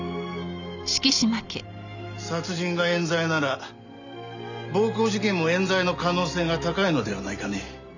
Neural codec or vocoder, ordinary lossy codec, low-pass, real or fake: none; none; 7.2 kHz; real